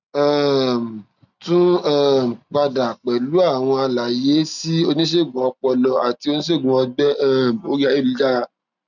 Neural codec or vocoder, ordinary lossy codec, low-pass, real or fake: none; none; 7.2 kHz; real